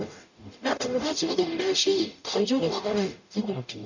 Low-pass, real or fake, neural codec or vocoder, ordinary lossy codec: 7.2 kHz; fake; codec, 44.1 kHz, 0.9 kbps, DAC; none